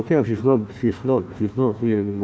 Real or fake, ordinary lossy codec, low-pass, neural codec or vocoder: fake; none; none; codec, 16 kHz, 1 kbps, FunCodec, trained on Chinese and English, 50 frames a second